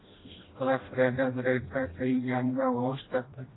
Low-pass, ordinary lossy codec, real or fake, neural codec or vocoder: 7.2 kHz; AAC, 16 kbps; fake; codec, 16 kHz, 1 kbps, FreqCodec, smaller model